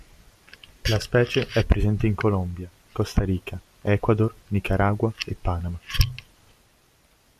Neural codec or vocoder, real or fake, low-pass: none; real; 14.4 kHz